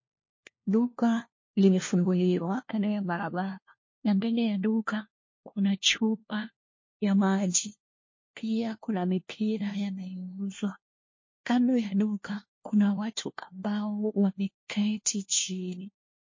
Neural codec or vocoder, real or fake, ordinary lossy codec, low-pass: codec, 16 kHz, 1 kbps, FunCodec, trained on LibriTTS, 50 frames a second; fake; MP3, 32 kbps; 7.2 kHz